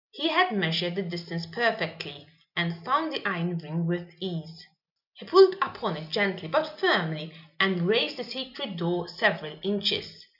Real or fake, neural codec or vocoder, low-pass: real; none; 5.4 kHz